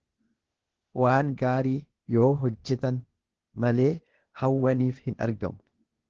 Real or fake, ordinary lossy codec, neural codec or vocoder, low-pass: fake; Opus, 16 kbps; codec, 16 kHz, 0.8 kbps, ZipCodec; 7.2 kHz